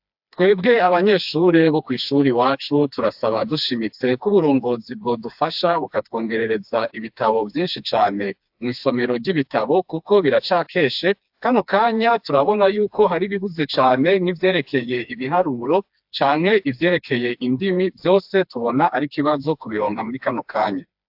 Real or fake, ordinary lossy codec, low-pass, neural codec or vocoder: fake; AAC, 48 kbps; 5.4 kHz; codec, 16 kHz, 2 kbps, FreqCodec, smaller model